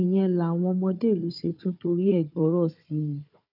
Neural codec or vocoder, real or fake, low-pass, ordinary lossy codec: codec, 16 kHz, 4 kbps, FunCodec, trained on Chinese and English, 50 frames a second; fake; 5.4 kHz; none